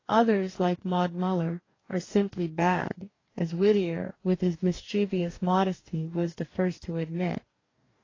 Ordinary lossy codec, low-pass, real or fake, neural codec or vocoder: AAC, 32 kbps; 7.2 kHz; fake; codec, 44.1 kHz, 2.6 kbps, DAC